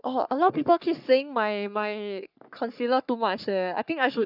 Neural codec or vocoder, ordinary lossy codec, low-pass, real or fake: codec, 44.1 kHz, 3.4 kbps, Pupu-Codec; none; 5.4 kHz; fake